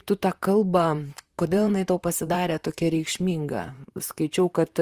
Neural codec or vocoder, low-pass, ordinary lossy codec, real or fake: vocoder, 44.1 kHz, 128 mel bands, Pupu-Vocoder; 14.4 kHz; Opus, 32 kbps; fake